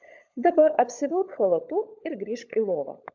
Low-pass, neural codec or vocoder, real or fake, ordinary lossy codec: 7.2 kHz; codec, 16 kHz, 16 kbps, FunCodec, trained on LibriTTS, 50 frames a second; fake; MP3, 64 kbps